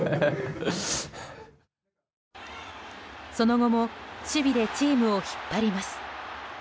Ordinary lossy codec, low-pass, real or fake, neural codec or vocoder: none; none; real; none